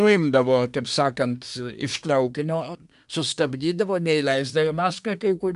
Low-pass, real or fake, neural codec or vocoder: 10.8 kHz; fake; codec, 24 kHz, 1 kbps, SNAC